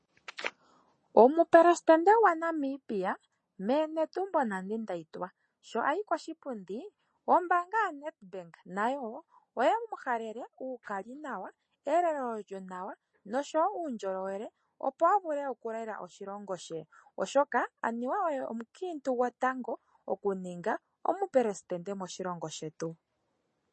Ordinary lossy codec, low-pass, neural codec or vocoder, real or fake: MP3, 32 kbps; 10.8 kHz; none; real